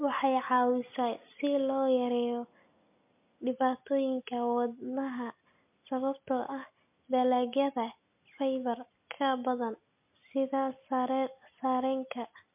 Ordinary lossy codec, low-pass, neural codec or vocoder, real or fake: MP3, 32 kbps; 3.6 kHz; none; real